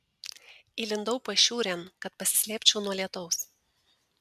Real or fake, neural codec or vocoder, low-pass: real; none; 14.4 kHz